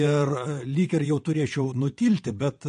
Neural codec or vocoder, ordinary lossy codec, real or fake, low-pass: vocoder, 22.05 kHz, 80 mel bands, WaveNeXt; MP3, 48 kbps; fake; 9.9 kHz